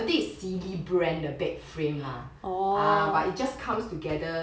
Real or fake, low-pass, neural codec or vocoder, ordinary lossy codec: real; none; none; none